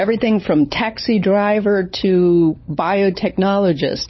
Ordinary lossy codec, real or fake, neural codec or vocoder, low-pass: MP3, 24 kbps; fake; codec, 16 kHz, 16 kbps, FunCodec, trained on LibriTTS, 50 frames a second; 7.2 kHz